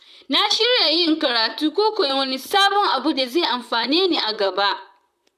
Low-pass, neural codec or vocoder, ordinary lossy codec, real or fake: 14.4 kHz; vocoder, 44.1 kHz, 128 mel bands, Pupu-Vocoder; none; fake